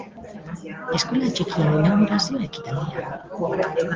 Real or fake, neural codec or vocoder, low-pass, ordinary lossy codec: real; none; 7.2 kHz; Opus, 16 kbps